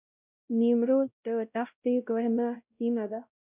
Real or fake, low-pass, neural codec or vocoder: fake; 3.6 kHz; codec, 16 kHz, 0.5 kbps, X-Codec, WavLM features, trained on Multilingual LibriSpeech